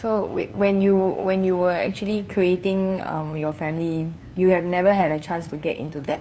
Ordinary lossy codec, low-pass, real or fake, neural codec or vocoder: none; none; fake; codec, 16 kHz, 2 kbps, FunCodec, trained on LibriTTS, 25 frames a second